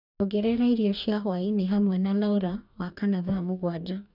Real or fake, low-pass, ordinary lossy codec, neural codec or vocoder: fake; 5.4 kHz; none; codec, 44.1 kHz, 2.6 kbps, DAC